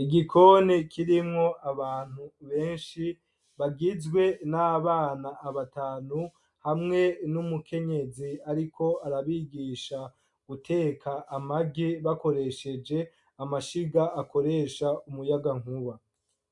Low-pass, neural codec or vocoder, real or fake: 10.8 kHz; none; real